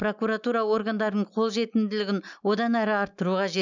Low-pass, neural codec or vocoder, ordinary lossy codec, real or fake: 7.2 kHz; none; none; real